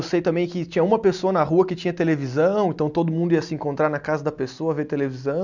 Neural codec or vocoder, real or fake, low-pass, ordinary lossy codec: none; real; 7.2 kHz; none